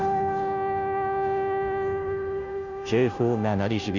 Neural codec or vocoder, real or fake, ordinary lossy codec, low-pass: codec, 16 kHz, 0.5 kbps, FunCodec, trained on Chinese and English, 25 frames a second; fake; none; 7.2 kHz